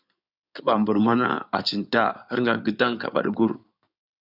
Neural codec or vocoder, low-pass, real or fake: codec, 16 kHz in and 24 kHz out, 2.2 kbps, FireRedTTS-2 codec; 5.4 kHz; fake